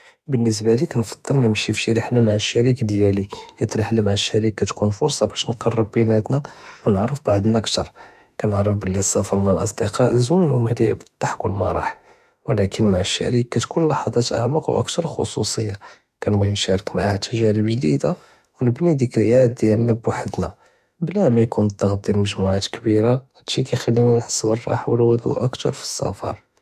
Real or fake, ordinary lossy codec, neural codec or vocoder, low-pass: fake; none; autoencoder, 48 kHz, 32 numbers a frame, DAC-VAE, trained on Japanese speech; 14.4 kHz